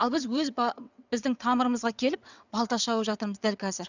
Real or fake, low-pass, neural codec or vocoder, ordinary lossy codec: fake; 7.2 kHz; vocoder, 22.05 kHz, 80 mel bands, WaveNeXt; none